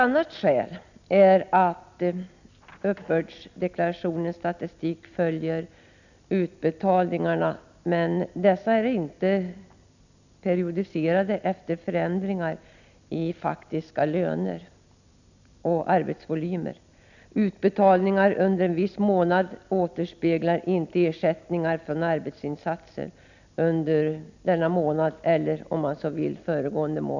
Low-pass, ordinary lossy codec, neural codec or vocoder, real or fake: 7.2 kHz; none; none; real